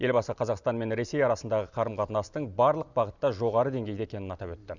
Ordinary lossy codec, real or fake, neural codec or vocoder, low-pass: none; real; none; 7.2 kHz